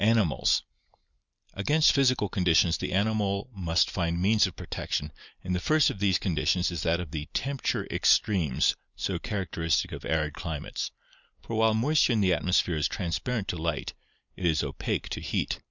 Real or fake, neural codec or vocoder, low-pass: real; none; 7.2 kHz